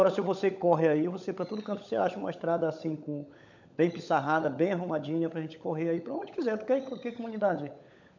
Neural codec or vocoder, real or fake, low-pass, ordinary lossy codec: codec, 16 kHz, 16 kbps, FunCodec, trained on LibriTTS, 50 frames a second; fake; 7.2 kHz; none